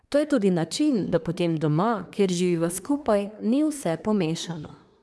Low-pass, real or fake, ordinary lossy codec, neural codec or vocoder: none; fake; none; codec, 24 kHz, 1 kbps, SNAC